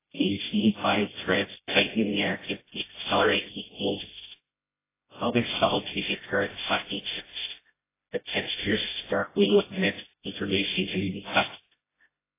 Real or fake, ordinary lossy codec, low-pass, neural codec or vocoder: fake; AAC, 16 kbps; 3.6 kHz; codec, 16 kHz, 0.5 kbps, FreqCodec, smaller model